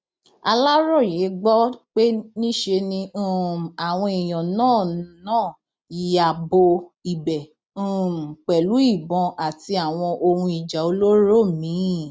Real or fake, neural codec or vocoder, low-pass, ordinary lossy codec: real; none; none; none